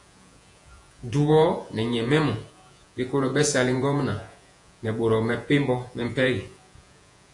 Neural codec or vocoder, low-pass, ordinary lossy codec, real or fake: vocoder, 48 kHz, 128 mel bands, Vocos; 10.8 kHz; AAC, 64 kbps; fake